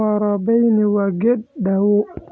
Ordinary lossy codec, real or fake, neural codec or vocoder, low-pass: none; real; none; none